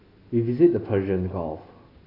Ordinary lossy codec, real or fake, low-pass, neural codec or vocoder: AAC, 24 kbps; real; 5.4 kHz; none